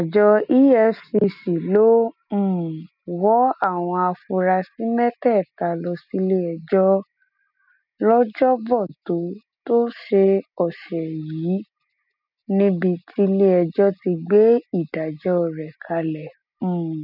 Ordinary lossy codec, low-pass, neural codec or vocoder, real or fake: none; 5.4 kHz; none; real